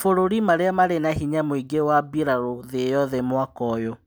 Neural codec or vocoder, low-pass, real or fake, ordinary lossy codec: none; none; real; none